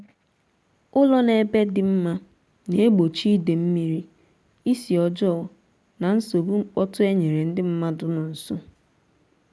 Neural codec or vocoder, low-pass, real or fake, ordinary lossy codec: none; none; real; none